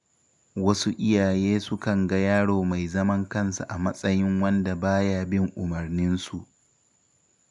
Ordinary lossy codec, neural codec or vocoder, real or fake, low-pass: none; none; real; 10.8 kHz